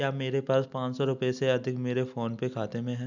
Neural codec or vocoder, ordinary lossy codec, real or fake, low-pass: none; none; real; 7.2 kHz